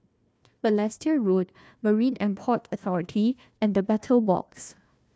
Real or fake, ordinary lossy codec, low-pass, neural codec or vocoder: fake; none; none; codec, 16 kHz, 1 kbps, FunCodec, trained on Chinese and English, 50 frames a second